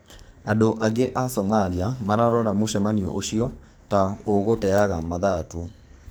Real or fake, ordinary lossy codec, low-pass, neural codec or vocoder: fake; none; none; codec, 44.1 kHz, 2.6 kbps, SNAC